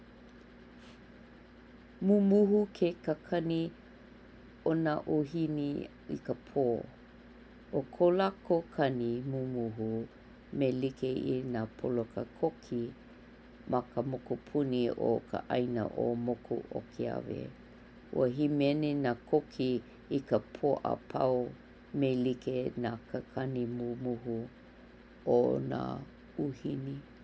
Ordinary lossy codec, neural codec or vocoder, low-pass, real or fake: none; none; none; real